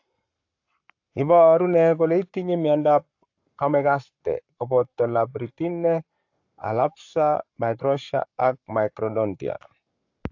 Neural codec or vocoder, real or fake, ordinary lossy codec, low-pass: codec, 44.1 kHz, 7.8 kbps, Pupu-Codec; fake; AAC, 48 kbps; 7.2 kHz